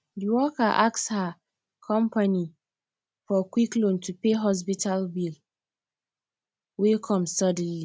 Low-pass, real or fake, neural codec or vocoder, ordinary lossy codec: none; real; none; none